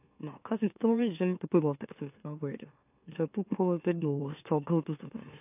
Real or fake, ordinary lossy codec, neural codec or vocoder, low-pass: fake; AAC, 32 kbps; autoencoder, 44.1 kHz, a latent of 192 numbers a frame, MeloTTS; 3.6 kHz